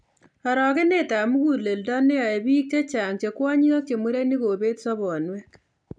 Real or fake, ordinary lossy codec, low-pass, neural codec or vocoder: real; none; 9.9 kHz; none